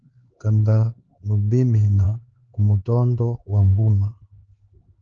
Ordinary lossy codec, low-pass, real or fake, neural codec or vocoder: Opus, 16 kbps; 7.2 kHz; fake; codec, 16 kHz, 4 kbps, X-Codec, HuBERT features, trained on LibriSpeech